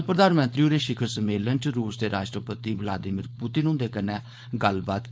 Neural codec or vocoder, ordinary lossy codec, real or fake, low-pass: codec, 16 kHz, 4.8 kbps, FACodec; none; fake; none